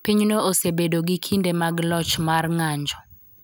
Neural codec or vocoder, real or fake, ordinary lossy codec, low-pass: none; real; none; none